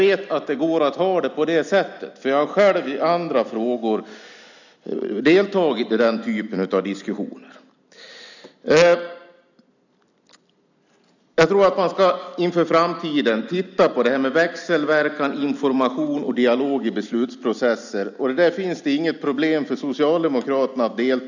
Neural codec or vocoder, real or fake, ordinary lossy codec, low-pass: none; real; none; 7.2 kHz